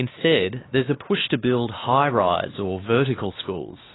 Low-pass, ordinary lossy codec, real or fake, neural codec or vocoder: 7.2 kHz; AAC, 16 kbps; fake; codec, 16 kHz, 2 kbps, X-Codec, WavLM features, trained on Multilingual LibriSpeech